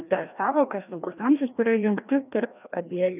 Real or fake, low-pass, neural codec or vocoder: fake; 3.6 kHz; codec, 16 kHz, 1 kbps, FreqCodec, larger model